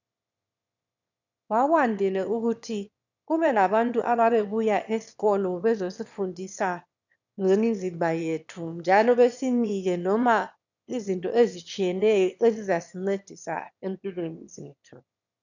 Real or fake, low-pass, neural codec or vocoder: fake; 7.2 kHz; autoencoder, 22.05 kHz, a latent of 192 numbers a frame, VITS, trained on one speaker